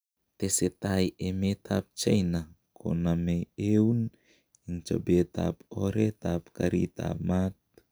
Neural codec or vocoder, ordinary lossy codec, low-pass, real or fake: none; none; none; real